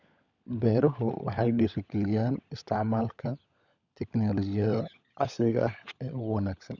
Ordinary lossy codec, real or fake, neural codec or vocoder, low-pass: none; fake; codec, 16 kHz, 16 kbps, FunCodec, trained on LibriTTS, 50 frames a second; 7.2 kHz